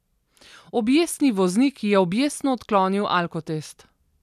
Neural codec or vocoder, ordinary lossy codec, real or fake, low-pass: none; none; real; 14.4 kHz